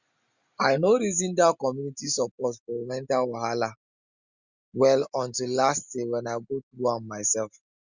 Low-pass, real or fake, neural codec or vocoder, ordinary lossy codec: 7.2 kHz; real; none; Opus, 64 kbps